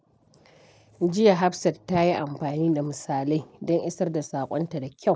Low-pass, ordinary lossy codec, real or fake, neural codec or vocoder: none; none; real; none